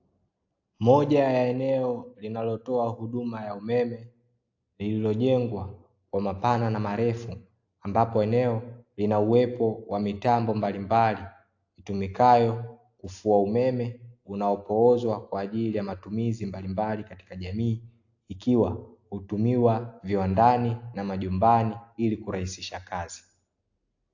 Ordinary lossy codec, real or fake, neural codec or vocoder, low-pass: AAC, 48 kbps; real; none; 7.2 kHz